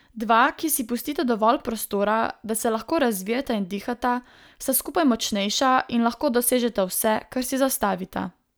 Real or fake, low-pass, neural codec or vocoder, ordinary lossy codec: real; none; none; none